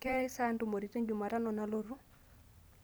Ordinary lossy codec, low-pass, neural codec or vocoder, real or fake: none; none; vocoder, 44.1 kHz, 128 mel bands every 256 samples, BigVGAN v2; fake